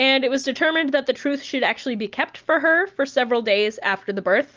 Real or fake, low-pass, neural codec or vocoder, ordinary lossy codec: real; 7.2 kHz; none; Opus, 24 kbps